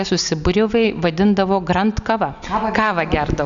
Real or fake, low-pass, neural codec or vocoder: real; 7.2 kHz; none